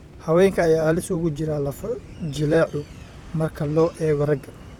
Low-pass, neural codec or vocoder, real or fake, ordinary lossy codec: 19.8 kHz; vocoder, 44.1 kHz, 128 mel bands, Pupu-Vocoder; fake; none